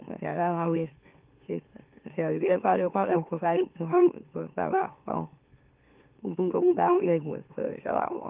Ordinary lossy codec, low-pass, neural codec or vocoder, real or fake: Opus, 32 kbps; 3.6 kHz; autoencoder, 44.1 kHz, a latent of 192 numbers a frame, MeloTTS; fake